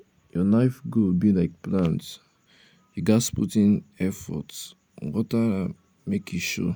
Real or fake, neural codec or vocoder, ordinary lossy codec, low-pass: real; none; none; none